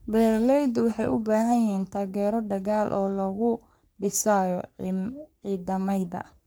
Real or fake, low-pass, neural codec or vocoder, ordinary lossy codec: fake; none; codec, 44.1 kHz, 3.4 kbps, Pupu-Codec; none